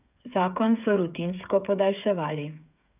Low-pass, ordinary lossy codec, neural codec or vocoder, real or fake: 3.6 kHz; none; codec, 16 kHz, 8 kbps, FreqCodec, smaller model; fake